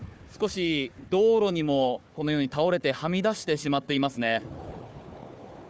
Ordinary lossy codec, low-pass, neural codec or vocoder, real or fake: none; none; codec, 16 kHz, 4 kbps, FunCodec, trained on Chinese and English, 50 frames a second; fake